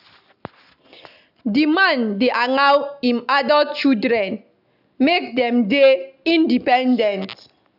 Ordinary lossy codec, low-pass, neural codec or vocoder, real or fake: none; 5.4 kHz; none; real